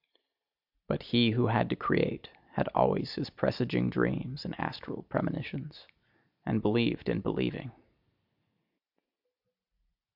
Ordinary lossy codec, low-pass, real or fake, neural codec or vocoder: AAC, 48 kbps; 5.4 kHz; real; none